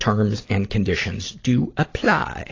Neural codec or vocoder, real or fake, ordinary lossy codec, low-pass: none; real; AAC, 32 kbps; 7.2 kHz